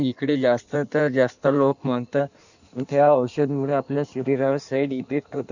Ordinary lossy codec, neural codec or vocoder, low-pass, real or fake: none; codec, 16 kHz in and 24 kHz out, 1.1 kbps, FireRedTTS-2 codec; 7.2 kHz; fake